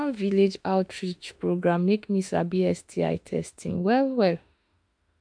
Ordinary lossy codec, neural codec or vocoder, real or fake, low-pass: AAC, 64 kbps; autoencoder, 48 kHz, 32 numbers a frame, DAC-VAE, trained on Japanese speech; fake; 9.9 kHz